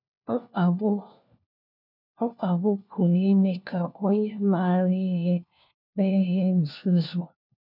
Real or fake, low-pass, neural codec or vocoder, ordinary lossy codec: fake; 5.4 kHz; codec, 16 kHz, 1 kbps, FunCodec, trained on LibriTTS, 50 frames a second; none